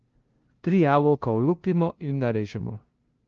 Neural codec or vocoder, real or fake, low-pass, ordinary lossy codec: codec, 16 kHz, 0.5 kbps, FunCodec, trained on LibriTTS, 25 frames a second; fake; 7.2 kHz; Opus, 16 kbps